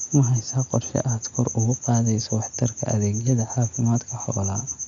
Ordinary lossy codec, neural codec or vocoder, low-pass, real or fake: none; none; 7.2 kHz; real